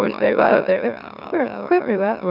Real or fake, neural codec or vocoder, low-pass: fake; autoencoder, 44.1 kHz, a latent of 192 numbers a frame, MeloTTS; 5.4 kHz